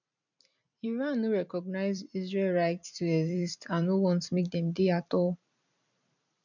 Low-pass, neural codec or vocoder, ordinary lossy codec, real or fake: 7.2 kHz; vocoder, 44.1 kHz, 128 mel bands every 256 samples, BigVGAN v2; none; fake